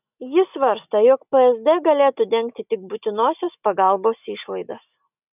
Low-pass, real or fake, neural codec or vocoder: 3.6 kHz; real; none